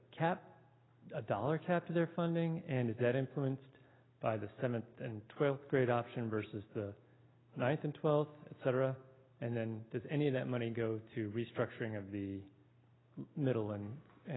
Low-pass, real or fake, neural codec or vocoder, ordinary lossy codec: 7.2 kHz; real; none; AAC, 16 kbps